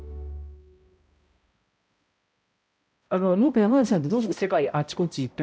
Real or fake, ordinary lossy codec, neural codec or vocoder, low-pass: fake; none; codec, 16 kHz, 0.5 kbps, X-Codec, HuBERT features, trained on balanced general audio; none